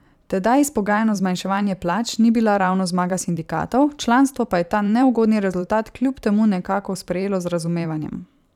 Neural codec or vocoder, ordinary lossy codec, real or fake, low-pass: vocoder, 44.1 kHz, 128 mel bands every 512 samples, BigVGAN v2; none; fake; 19.8 kHz